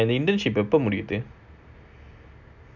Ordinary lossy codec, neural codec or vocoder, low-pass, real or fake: none; none; 7.2 kHz; real